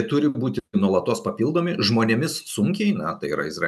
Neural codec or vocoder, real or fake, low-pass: none; real; 14.4 kHz